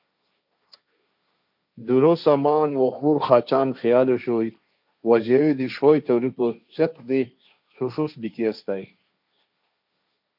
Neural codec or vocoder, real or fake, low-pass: codec, 16 kHz, 1.1 kbps, Voila-Tokenizer; fake; 5.4 kHz